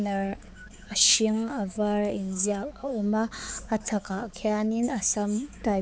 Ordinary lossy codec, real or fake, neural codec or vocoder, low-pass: none; fake; codec, 16 kHz, 4 kbps, X-Codec, HuBERT features, trained on balanced general audio; none